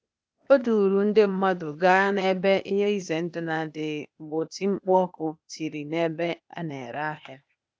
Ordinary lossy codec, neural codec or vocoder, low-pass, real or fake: none; codec, 16 kHz, 0.8 kbps, ZipCodec; none; fake